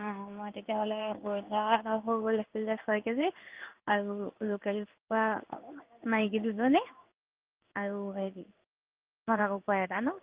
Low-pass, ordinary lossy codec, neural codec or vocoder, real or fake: 3.6 kHz; Opus, 24 kbps; codec, 16 kHz in and 24 kHz out, 1 kbps, XY-Tokenizer; fake